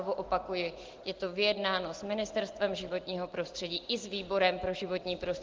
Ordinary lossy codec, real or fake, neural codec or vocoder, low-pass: Opus, 16 kbps; real; none; 7.2 kHz